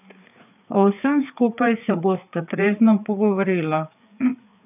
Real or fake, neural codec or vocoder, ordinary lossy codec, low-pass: fake; codec, 16 kHz, 4 kbps, FreqCodec, larger model; none; 3.6 kHz